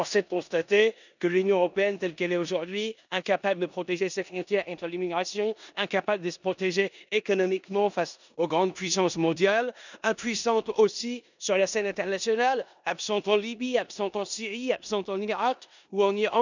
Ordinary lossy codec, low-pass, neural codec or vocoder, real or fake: none; 7.2 kHz; codec, 16 kHz in and 24 kHz out, 0.9 kbps, LongCat-Audio-Codec, four codebook decoder; fake